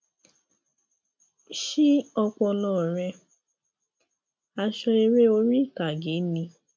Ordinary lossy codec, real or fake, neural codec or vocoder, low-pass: none; real; none; none